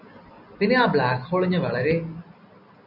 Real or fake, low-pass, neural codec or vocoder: real; 5.4 kHz; none